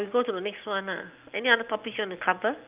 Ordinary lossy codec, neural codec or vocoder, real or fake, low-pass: Opus, 32 kbps; none; real; 3.6 kHz